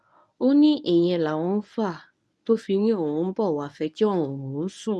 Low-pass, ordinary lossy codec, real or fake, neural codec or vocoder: none; none; fake; codec, 24 kHz, 0.9 kbps, WavTokenizer, medium speech release version 1